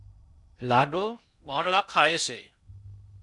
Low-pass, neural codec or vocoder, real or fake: 10.8 kHz; codec, 16 kHz in and 24 kHz out, 0.6 kbps, FocalCodec, streaming, 2048 codes; fake